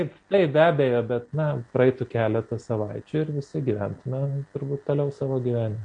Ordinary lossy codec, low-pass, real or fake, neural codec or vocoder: MP3, 48 kbps; 9.9 kHz; real; none